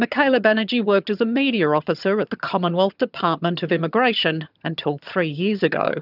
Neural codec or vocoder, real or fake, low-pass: vocoder, 22.05 kHz, 80 mel bands, HiFi-GAN; fake; 5.4 kHz